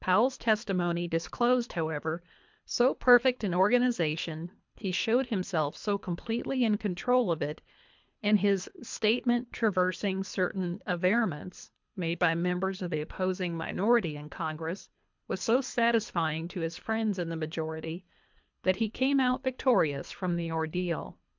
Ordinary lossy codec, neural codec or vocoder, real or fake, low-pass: MP3, 64 kbps; codec, 24 kHz, 3 kbps, HILCodec; fake; 7.2 kHz